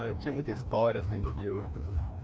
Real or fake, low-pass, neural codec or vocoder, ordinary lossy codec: fake; none; codec, 16 kHz, 1 kbps, FreqCodec, larger model; none